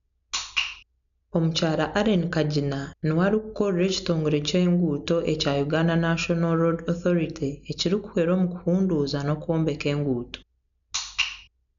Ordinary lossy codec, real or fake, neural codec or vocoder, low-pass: none; real; none; 7.2 kHz